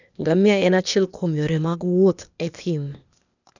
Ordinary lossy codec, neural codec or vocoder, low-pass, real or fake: none; codec, 16 kHz, 0.8 kbps, ZipCodec; 7.2 kHz; fake